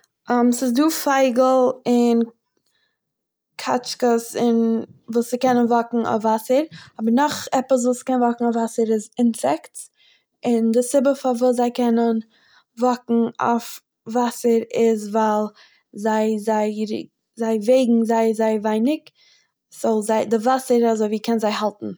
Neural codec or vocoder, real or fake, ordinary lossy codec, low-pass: none; real; none; none